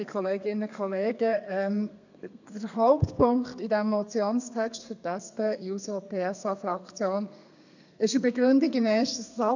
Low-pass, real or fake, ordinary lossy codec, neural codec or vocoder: 7.2 kHz; fake; none; codec, 32 kHz, 1.9 kbps, SNAC